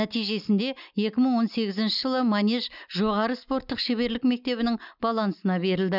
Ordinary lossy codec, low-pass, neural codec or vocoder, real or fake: none; 5.4 kHz; none; real